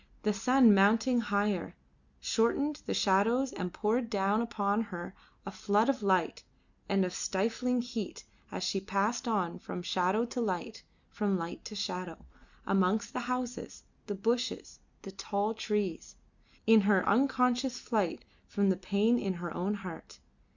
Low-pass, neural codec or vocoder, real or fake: 7.2 kHz; none; real